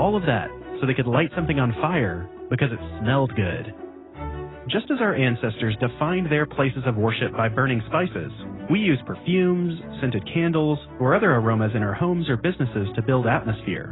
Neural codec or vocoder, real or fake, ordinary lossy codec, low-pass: none; real; AAC, 16 kbps; 7.2 kHz